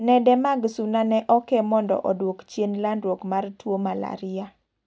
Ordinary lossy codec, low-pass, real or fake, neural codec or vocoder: none; none; real; none